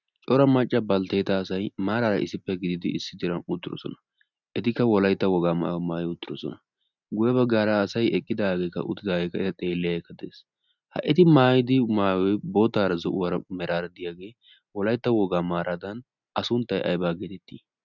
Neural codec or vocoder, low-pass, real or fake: none; 7.2 kHz; real